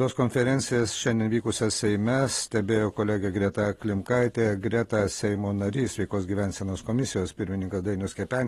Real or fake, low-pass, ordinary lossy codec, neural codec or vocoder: real; 19.8 kHz; AAC, 32 kbps; none